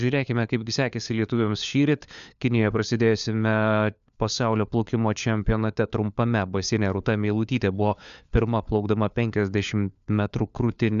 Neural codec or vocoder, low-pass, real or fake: codec, 16 kHz, 4 kbps, FunCodec, trained on LibriTTS, 50 frames a second; 7.2 kHz; fake